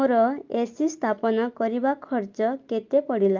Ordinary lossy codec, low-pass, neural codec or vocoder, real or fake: Opus, 24 kbps; 7.2 kHz; none; real